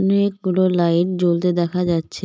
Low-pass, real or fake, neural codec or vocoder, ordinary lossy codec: none; real; none; none